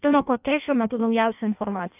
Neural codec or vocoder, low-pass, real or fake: codec, 16 kHz in and 24 kHz out, 0.6 kbps, FireRedTTS-2 codec; 3.6 kHz; fake